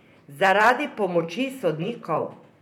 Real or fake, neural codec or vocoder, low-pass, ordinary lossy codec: fake; vocoder, 44.1 kHz, 128 mel bands, Pupu-Vocoder; 19.8 kHz; none